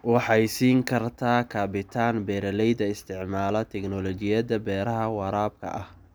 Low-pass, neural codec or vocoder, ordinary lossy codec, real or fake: none; none; none; real